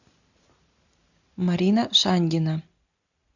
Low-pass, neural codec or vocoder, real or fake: 7.2 kHz; none; real